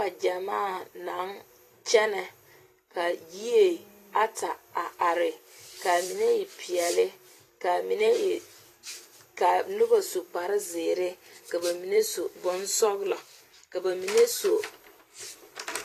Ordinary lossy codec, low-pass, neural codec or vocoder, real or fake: AAC, 48 kbps; 14.4 kHz; vocoder, 44.1 kHz, 128 mel bands every 256 samples, BigVGAN v2; fake